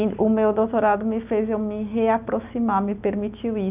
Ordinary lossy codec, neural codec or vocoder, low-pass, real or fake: none; none; 3.6 kHz; real